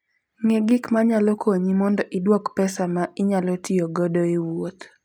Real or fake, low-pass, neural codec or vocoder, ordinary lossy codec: real; 19.8 kHz; none; none